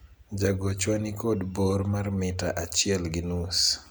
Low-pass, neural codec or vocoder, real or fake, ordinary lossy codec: none; none; real; none